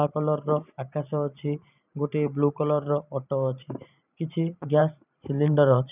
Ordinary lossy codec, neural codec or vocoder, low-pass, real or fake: none; vocoder, 44.1 kHz, 128 mel bands every 512 samples, BigVGAN v2; 3.6 kHz; fake